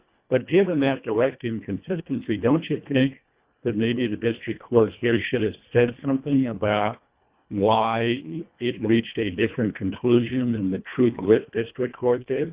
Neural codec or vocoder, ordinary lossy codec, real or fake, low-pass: codec, 24 kHz, 1.5 kbps, HILCodec; Opus, 64 kbps; fake; 3.6 kHz